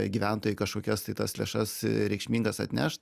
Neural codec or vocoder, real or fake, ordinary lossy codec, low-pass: none; real; Opus, 64 kbps; 14.4 kHz